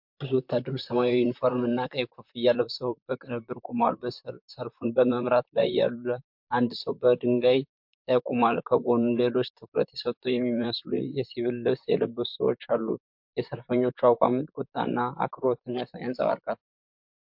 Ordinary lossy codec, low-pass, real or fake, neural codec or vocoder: MP3, 48 kbps; 5.4 kHz; fake; vocoder, 44.1 kHz, 128 mel bands, Pupu-Vocoder